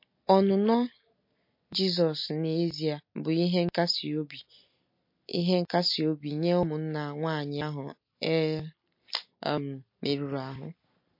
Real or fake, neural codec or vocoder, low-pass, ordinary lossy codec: real; none; 5.4 kHz; MP3, 32 kbps